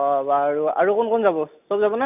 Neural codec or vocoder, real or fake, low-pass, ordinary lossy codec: none; real; 3.6 kHz; none